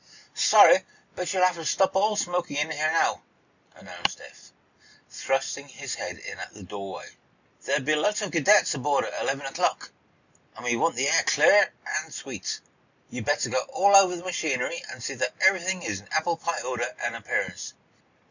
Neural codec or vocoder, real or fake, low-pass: none; real; 7.2 kHz